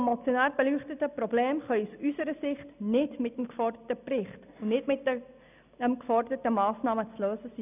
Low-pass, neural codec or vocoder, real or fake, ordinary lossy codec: 3.6 kHz; none; real; none